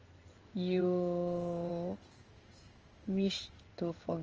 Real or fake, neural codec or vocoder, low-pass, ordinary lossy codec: fake; codec, 16 kHz in and 24 kHz out, 1 kbps, XY-Tokenizer; 7.2 kHz; Opus, 24 kbps